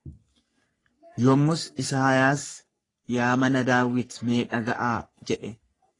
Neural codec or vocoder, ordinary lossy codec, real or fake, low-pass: codec, 44.1 kHz, 3.4 kbps, Pupu-Codec; AAC, 32 kbps; fake; 10.8 kHz